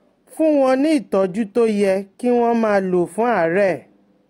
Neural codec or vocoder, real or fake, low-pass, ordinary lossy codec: none; real; 19.8 kHz; AAC, 48 kbps